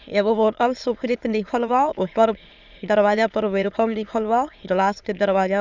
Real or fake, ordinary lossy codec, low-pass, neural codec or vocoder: fake; Opus, 64 kbps; 7.2 kHz; autoencoder, 22.05 kHz, a latent of 192 numbers a frame, VITS, trained on many speakers